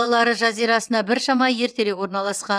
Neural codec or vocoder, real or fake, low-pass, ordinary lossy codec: vocoder, 22.05 kHz, 80 mel bands, WaveNeXt; fake; none; none